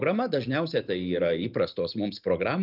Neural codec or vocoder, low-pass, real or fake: none; 5.4 kHz; real